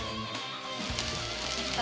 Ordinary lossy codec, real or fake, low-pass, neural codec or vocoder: none; real; none; none